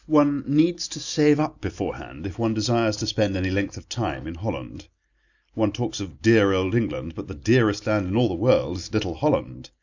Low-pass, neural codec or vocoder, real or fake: 7.2 kHz; none; real